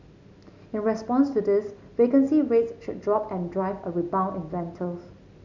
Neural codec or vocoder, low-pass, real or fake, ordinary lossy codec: none; 7.2 kHz; real; none